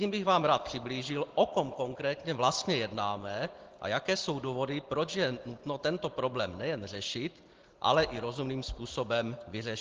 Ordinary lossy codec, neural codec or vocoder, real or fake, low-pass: Opus, 16 kbps; none; real; 7.2 kHz